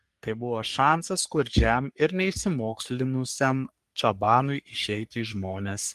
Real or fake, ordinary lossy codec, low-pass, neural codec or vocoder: fake; Opus, 32 kbps; 14.4 kHz; codec, 44.1 kHz, 3.4 kbps, Pupu-Codec